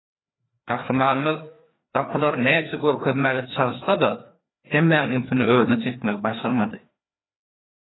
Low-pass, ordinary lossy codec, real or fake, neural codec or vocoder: 7.2 kHz; AAC, 16 kbps; fake; codec, 16 kHz, 2 kbps, FreqCodec, larger model